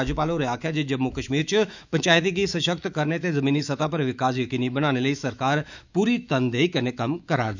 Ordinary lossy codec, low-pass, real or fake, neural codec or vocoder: none; 7.2 kHz; fake; autoencoder, 48 kHz, 128 numbers a frame, DAC-VAE, trained on Japanese speech